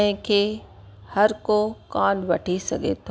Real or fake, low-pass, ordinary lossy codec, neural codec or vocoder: real; none; none; none